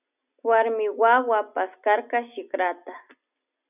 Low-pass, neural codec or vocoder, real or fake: 3.6 kHz; none; real